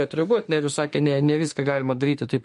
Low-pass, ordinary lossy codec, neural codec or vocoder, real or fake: 14.4 kHz; MP3, 48 kbps; autoencoder, 48 kHz, 32 numbers a frame, DAC-VAE, trained on Japanese speech; fake